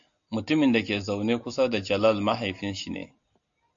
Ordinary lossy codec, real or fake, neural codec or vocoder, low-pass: AAC, 64 kbps; real; none; 7.2 kHz